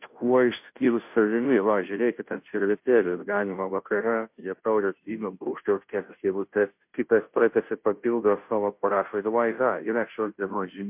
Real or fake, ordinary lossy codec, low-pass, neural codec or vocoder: fake; MP3, 32 kbps; 3.6 kHz; codec, 16 kHz, 0.5 kbps, FunCodec, trained on Chinese and English, 25 frames a second